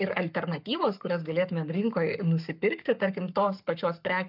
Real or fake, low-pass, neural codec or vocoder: fake; 5.4 kHz; codec, 44.1 kHz, 7.8 kbps, Pupu-Codec